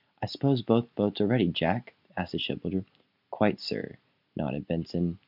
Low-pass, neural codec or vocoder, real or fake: 5.4 kHz; none; real